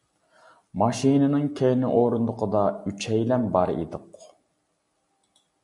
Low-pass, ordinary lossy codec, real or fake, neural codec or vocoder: 10.8 kHz; MP3, 64 kbps; real; none